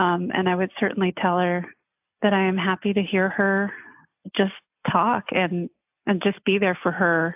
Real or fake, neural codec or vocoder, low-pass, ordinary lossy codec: real; none; 3.6 kHz; AAC, 32 kbps